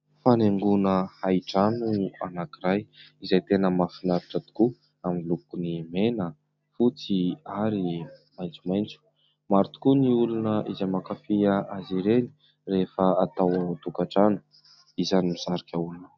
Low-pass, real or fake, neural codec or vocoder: 7.2 kHz; real; none